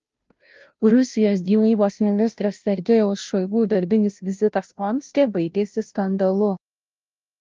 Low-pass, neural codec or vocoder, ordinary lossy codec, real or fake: 7.2 kHz; codec, 16 kHz, 0.5 kbps, FunCodec, trained on Chinese and English, 25 frames a second; Opus, 32 kbps; fake